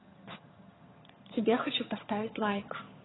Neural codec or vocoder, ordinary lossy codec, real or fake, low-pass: vocoder, 22.05 kHz, 80 mel bands, HiFi-GAN; AAC, 16 kbps; fake; 7.2 kHz